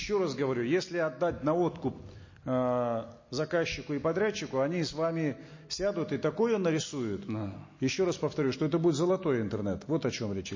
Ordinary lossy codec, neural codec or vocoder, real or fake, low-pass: MP3, 32 kbps; none; real; 7.2 kHz